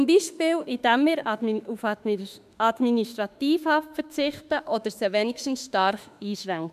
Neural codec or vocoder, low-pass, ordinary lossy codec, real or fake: autoencoder, 48 kHz, 32 numbers a frame, DAC-VAE, trained on Japanese speech; 14.4 kHz; none; fake